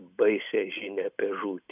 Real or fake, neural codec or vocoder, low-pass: real; none; 3.6 kHz